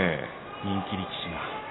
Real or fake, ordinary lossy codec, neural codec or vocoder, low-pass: real; AAC, 16 kbps; none; 7.2 kHz